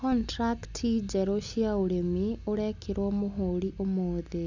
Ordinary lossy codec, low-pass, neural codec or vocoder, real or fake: none; 7.2 kHz; none; real